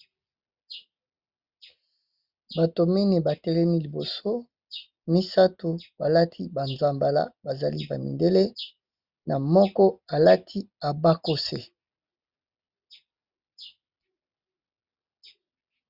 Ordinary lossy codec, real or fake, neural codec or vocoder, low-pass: Opus, 64 kbps; real; none; 5.4 kHz